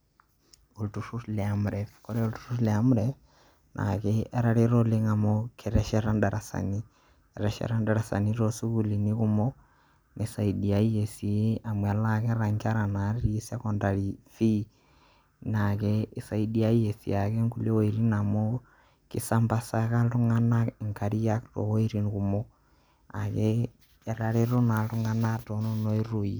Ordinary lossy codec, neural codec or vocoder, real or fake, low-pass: none; none; real; none